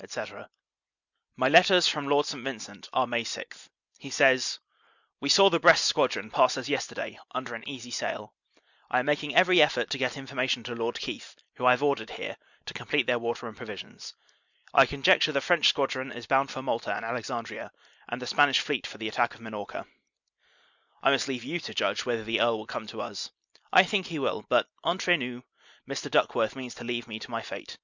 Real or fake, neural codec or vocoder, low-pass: real; none; 7.2 kHz